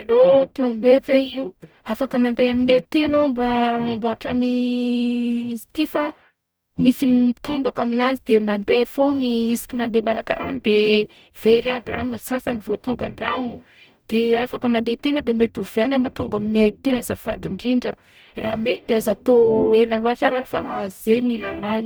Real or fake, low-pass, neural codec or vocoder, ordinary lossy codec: fake; none; codec, 44.1 kHz, 0.9 kbps, DAC; none